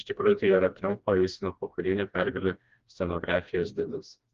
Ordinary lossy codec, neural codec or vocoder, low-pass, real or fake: Opus, 32 kbps; codec, 16 kHz, 1 kbps, FreqCodec, smaller model; 7.2 kHz; fake